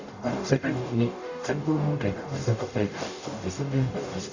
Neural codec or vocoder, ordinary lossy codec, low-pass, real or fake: codec, 44.1 kHz, 0.9 kbps, DAC; Opus, 64 kbps; 7.2 kHz; fake